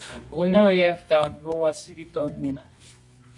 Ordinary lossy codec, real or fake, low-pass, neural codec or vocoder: MP3, 64 kbps; fake; 10.8 kHz; codec, 24 kHz, 0.9 kbps, WavTokenizer, medium music audio release